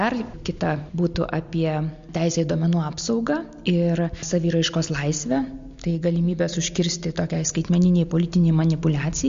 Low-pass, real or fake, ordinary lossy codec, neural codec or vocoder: 7.2 kHz; real; MP3, 48 kbps; none